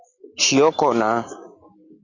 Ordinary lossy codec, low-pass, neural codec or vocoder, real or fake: Opus, 64 kbps; 7.2 kHz; none; real